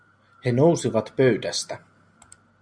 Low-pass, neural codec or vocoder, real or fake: 9.9 kHz; none; real